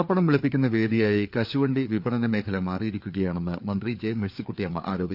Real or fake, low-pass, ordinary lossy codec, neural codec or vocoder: fake; 5.4 kHz; none; codec, 16 kHz, 4 kbps, FreqCodec, larger model